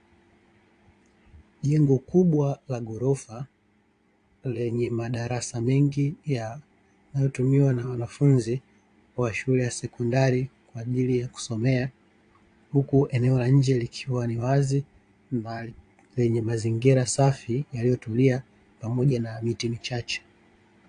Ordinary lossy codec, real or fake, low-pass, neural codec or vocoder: AAC, 48 kbps; fake; 9.9 kHz; vocoder, 22.05 kHz, 80 mel bands, Vocos